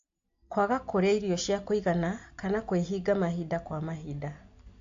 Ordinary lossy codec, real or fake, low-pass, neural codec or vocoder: AAC, 48 kbps; real; 7.2 kHz; none